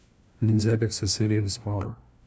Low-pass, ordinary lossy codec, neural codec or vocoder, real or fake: none; none; codec, 16 kHz, 1 kbps, FunCodec, trained on LibriTTS, 50 frames a second; fake